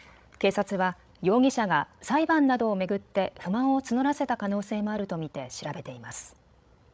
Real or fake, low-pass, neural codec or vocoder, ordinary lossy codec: fake; none; codec, 16 kHz, 16 kbps, FreqCodec, larger model; none